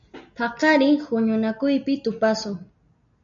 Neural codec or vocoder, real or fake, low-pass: none; real; 7.2 kHz